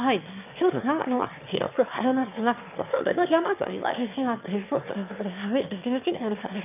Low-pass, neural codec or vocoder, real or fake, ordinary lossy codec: 3.6 kHz; autoencoder, 22.05 kHz, a latent of 192 numbers a frame, VITS, trained on one speaker; fake; none